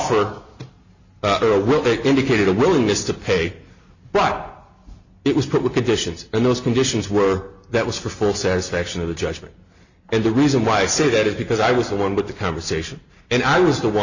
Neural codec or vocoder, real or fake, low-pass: none; real; 7.2 kHz